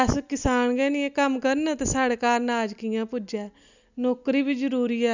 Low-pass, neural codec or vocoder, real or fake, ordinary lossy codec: 7.2 kHz; none; real; none